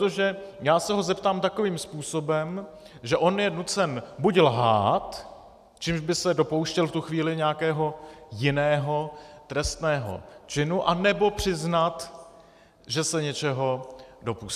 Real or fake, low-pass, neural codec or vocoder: real; 14.4 kHz; none